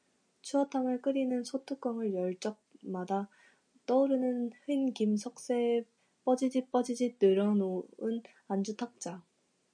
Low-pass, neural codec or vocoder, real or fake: 9.9 kHz; none; real